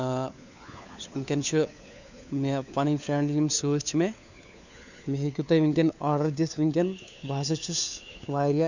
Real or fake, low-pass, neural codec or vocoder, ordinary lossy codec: fake; 7.2 kHz; codec, 16 kHz, 4 kbps, FunCodec, trained on LibriTTS, 50 frames a second; none